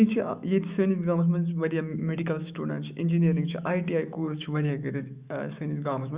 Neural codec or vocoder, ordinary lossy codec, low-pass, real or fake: autoencoder, 48 kHz, 128 numbers a frame, DAC-VAE, trained on Japanese speech; Opus, 64 kbps; 3.6 kHz; fake